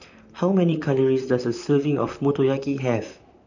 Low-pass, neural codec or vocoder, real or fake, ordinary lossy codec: 7.2 kHz; vocoder, 44.1 kHz, 128 mel bands, Pupu-Vocoder; fake; none